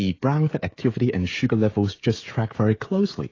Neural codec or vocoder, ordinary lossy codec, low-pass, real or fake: codec, 16 kHz, 16 kbps, FreqCodec, smaller model; AAC, 32 kbps; 7.2 kHz; fake